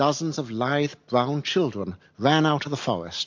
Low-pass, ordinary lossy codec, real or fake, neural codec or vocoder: 7.2 kHz; MP3, 48 kbps; real; none